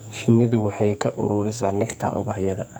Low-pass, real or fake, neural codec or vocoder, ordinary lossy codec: none; fake; codec, 44.1 kHz, 2.6 kbps, SNAC; none